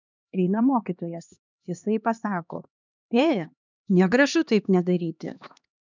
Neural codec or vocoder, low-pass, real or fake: codec, 16 kHz, 2 kbps, X-Codec, HuBERT features, trained on LibriSpeech; 7.2 kHz; fake